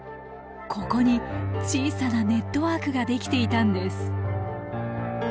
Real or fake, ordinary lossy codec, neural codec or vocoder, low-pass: real; none; none; none